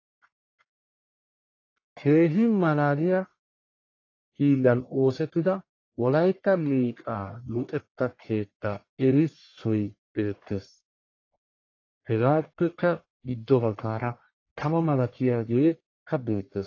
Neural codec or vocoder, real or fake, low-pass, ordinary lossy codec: codec, 44.1 kHz, 1.7 kbps, Pupu-Codec; fake; 7.2 kHz; AAC, 32 kbps